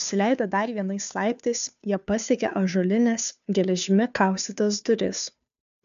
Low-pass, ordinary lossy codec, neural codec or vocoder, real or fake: 7.2 kHz; AAC, 96 kbps; codec, 16 kHz, 2 kbps, FunCodec, trained on Chinese and English, 25 frames a second; fake